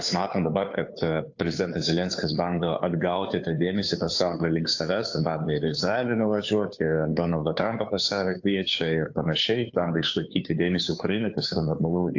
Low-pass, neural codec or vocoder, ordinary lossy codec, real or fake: 7.2 kHz; codec, 16 kHz, 2 kbps, FunCodec, trained on Chinese and English, 25 frames a second; AAC, 48 kbps; fake